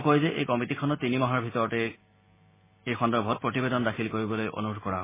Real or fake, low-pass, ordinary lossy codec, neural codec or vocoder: real; 3.6 kHz; MP3, 16 kbps; none